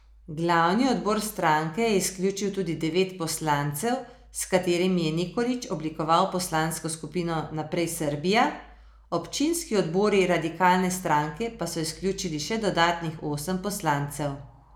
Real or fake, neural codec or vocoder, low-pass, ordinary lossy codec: real; none; none; none